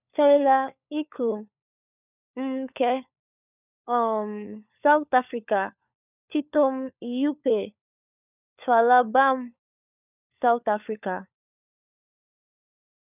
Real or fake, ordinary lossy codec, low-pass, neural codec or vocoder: fake; none; 3.6 kHz; codec, 16 kHz, 4 kbps, FunCodec, trained on LibriTTS, 50 frames a second